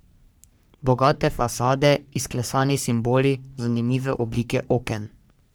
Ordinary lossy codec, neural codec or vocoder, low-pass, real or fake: none; codec, 44.1 kHz, 3.4 kbps, Pupu-Codec; none; fake